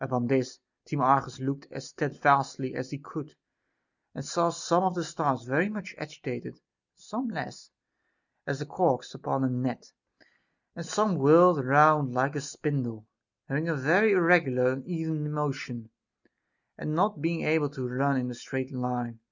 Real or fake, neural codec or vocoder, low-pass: real; none; 7.2 kHz